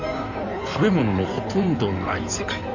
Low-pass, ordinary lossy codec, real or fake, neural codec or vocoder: 7.2 kHz; none; fake; codec, 16 kHz in and 24 kHz out, 2.2 kbps, FireRedTTS-2 codec